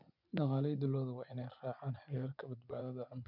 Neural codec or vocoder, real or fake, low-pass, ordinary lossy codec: vocoder, 24 kHz, 100 mel bands, Vocos; fake; 5.4 kHz; none